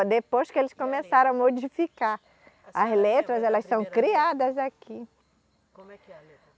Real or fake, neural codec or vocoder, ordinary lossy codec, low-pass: real; none; none; none